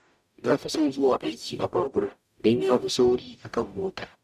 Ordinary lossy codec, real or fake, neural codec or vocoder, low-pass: none; fake; codec, 44.1 kHz, 0.9 kbps, DAC; 14.4 kHz